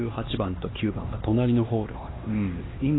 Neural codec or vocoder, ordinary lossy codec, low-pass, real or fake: codec, 16 kHz, 2 kbps, X-Codec, HuBERT features, trained on LibriSpeech; AAC, 16 kbps; 7.2 kHz; fake